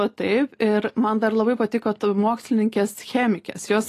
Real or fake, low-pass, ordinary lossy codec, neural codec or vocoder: real; 14.4 kHz; AAC, 48 kbps; none